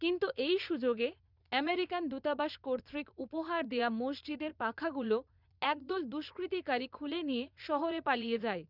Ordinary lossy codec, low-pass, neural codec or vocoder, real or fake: none; 5.4 kHz; vocoder, 22.05 kHz, 80 mel bands, Vocos; fake